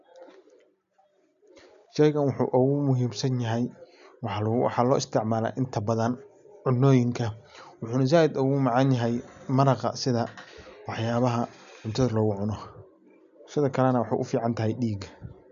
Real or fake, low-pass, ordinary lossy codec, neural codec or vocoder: real; 7.2 kHz; none; none